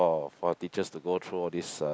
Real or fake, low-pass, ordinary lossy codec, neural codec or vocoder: real; none; none; none